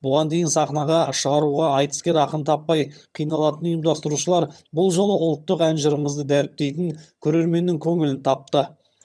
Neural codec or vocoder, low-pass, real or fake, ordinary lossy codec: vocoder, 22.05 kHz, 80 mel bands, HiFi-GAN; none; fake; none